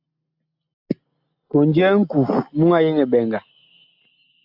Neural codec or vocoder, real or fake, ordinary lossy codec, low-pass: none; real; AAC, 48 kbps; 5.4 kHz